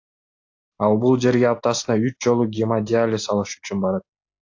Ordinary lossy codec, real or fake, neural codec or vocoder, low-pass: AAC, 48 kbps; real; none; 7.2 kHz